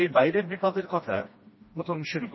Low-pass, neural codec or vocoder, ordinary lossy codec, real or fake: 7.2 kHz; codec, 16 kHz, 1 kbps, FreqCodec, smaller model; MP3, 24 kbps; fake